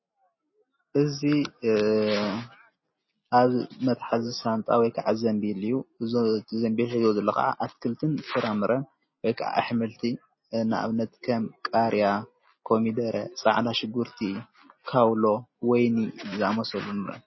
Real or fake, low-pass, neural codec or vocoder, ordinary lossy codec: real; 7.2 kHz; none; MP3, 24 kbps